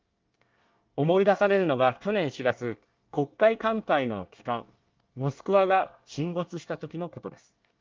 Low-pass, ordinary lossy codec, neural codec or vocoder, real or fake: 7.2 kHz; Opus, 24 kbps; codec, 24 kHz, 1 kbps, SNAC; fake